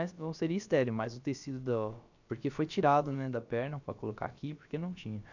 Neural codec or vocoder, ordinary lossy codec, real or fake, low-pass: codec, 16 kHz, about 1 kbps, DyCAST, with the encoder's durations; none; fake; 7.2 kHz